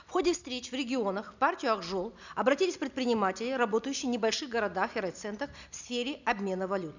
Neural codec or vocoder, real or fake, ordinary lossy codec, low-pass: none; real; none; 7.2 kHz